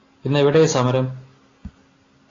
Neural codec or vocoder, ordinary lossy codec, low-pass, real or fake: none; AAC, 32 kbps; 7.2 kHz; real